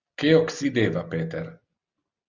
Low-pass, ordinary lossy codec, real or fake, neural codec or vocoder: 7.2 kHz; Opus, 64 kbps; real; none